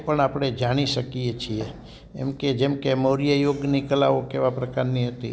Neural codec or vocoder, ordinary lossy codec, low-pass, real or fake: none; none; none; real